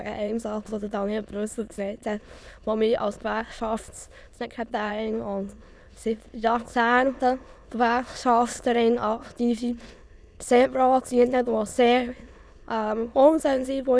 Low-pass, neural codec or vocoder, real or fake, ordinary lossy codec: none; autoencoder, 22.05 kHz, a latent of 192 numbers a frame, VITS, trained on many speakers; fake; none